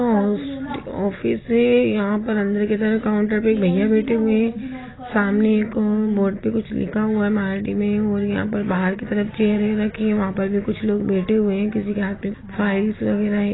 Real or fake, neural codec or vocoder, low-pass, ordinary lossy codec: real; none; 7.2 kHz; AAC, 16 kbps